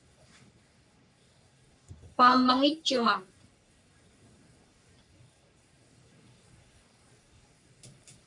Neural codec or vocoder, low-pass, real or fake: codec, 44.1 kHz, 3.4 kbps, Pupu-Codec; 10.8 kHz; fake